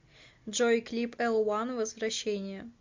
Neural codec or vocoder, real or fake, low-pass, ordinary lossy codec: none; real; 7.2 kHz; MP3, 64 kbps